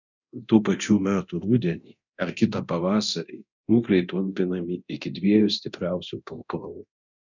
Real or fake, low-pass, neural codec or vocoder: fake; 7.2 kHz; codec, 24 kHz, 0.9 kbps, DualCodec